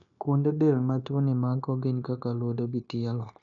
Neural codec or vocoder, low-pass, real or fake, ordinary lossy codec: codec, 16 kHz, 0.9 kbps, LongCat-Audio-Codec; 7.2 kHz; fake; none